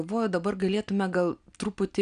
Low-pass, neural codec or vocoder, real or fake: 9.9 kHz; none; real